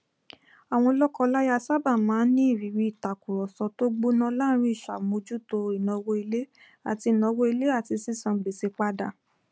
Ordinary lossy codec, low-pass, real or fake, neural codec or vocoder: none; none; real; none